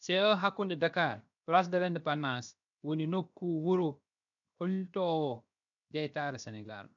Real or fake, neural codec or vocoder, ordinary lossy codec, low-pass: fake; codec, 16 kHz, 0.7 kbps, FocalCodec; none; 7.2 kHz